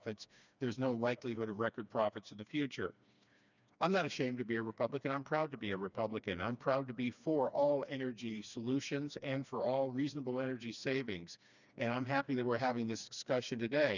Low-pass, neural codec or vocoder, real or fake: 7.2 kHz; codec, 16 kHz, 2 kbps, FreqCodec, smaller model; fake